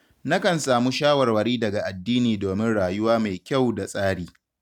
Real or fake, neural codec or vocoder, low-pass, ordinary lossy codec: real; none; 19.8 kHz; none